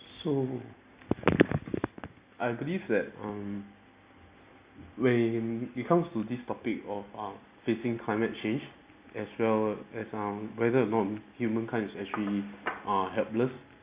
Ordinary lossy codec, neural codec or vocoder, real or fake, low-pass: Opus, 64 kbps; none; real; 3.6 kHz